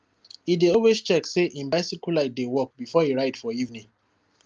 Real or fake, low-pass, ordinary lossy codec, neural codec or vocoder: real; 7.2 kHz; Opus, 32 kbps; none